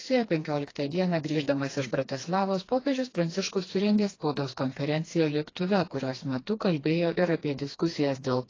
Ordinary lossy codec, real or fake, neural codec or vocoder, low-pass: AAC, 32 kbps; fake; codec, 16 kHz, 2 kbps, FreqCodec, smaller model; 7.2 kHz